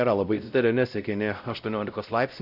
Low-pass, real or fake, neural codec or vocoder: 5.4 kHz; fake; codec, 16 kHz, 0.5 kbps, X-Codec, WavLM features, trained on Multilingual LibriSpeech